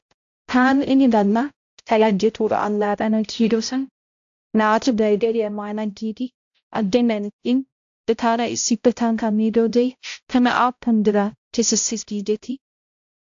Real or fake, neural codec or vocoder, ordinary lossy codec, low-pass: fake; codec, 16 kHz, 0.5 kbps, X-Codec, HuBERT features, trained on balanced general audio; AAC, 64 kbps; 7.2 kHz